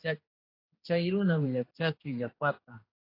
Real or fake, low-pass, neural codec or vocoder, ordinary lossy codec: fake; 5.4 kHz; codec, 16 kHz, 2 kbps, X-Codec, HuBERT features, trained on general audio; AAC, 24 kbps